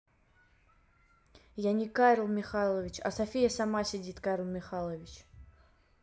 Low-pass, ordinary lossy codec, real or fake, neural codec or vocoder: none; none; real; none